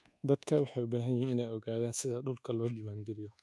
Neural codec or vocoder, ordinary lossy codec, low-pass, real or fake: codec, 24 kHz, 1.2 kbps, DualCodec; none; none; fake